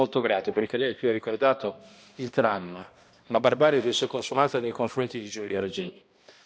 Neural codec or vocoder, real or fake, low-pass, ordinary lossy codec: codec, 16 kHz, 1 kbps, X-Codec, HuBERT features, trained on balanced general audio; fake; none; none